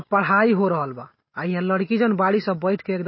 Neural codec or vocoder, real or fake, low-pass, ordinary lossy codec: none; real; 7.2 kHz; MP3, 24 kbps